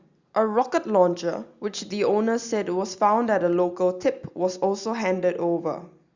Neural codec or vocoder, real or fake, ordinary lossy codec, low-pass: none; real; Opus, 64 kbps; 7.2 kHz